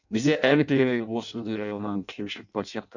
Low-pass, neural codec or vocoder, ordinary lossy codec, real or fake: 7.2 kHz; codec, 16 kHz in and 24 kHz out, 0.6 kbps, FireRedTTS-2 codec; none; fake